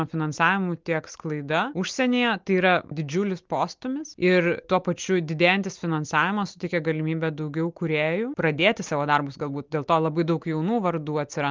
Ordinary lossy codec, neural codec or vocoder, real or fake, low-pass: Opus, 24 kbps; none; real; 7.2 kHz